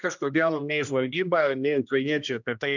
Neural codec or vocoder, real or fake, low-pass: codec, 16 kHz, 1 kbps, X-Codec, HuBERT features, trained on general audio; fake; 7.2 kHz